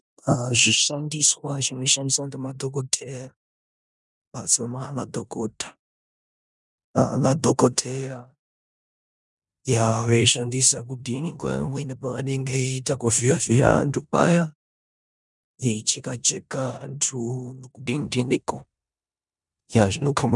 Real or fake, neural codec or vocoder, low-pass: fake; codec, 16 kHz in and 24 kHz out, 0.9 kbps, LongCat-Audio-Codec, four codebook decoder; 10.8 kHz